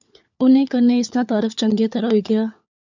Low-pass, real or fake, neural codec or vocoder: 7.2 kHz; fake; codec, 16 kHz, 4 kbps, FunCodec, trained on LibriTTS, 50 frames a second